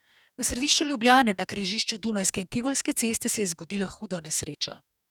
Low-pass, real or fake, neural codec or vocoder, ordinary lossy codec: 19.8 kHz; fake; codec, 44.1 kHz, 2.6 kbps, DAC; none